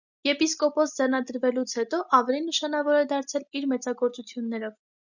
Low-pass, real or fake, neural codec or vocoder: 7.2 kHz; real; none